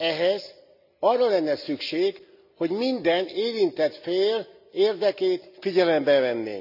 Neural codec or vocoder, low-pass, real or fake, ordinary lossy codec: none; 5.4 kHz; real; none